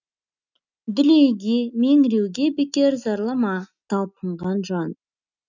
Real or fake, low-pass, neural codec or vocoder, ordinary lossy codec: real; 7.2 kHz; none; none